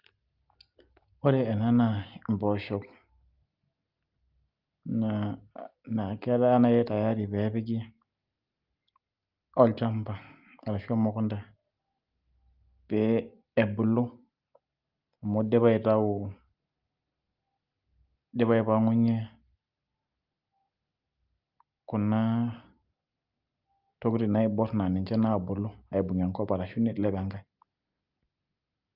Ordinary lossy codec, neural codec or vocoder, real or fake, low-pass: Opus, 24 kbps; none; real; 5.4 kHz